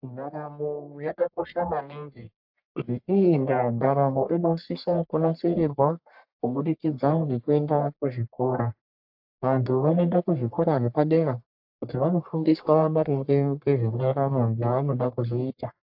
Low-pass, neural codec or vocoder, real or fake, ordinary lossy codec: 5.4 kHz; codec, 44.1 kHz, 1.7 kbps, Pupu-Codec; fake; AAC, 48 kbps